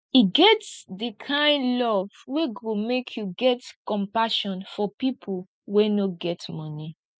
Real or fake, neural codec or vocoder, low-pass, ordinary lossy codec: real; none; none; none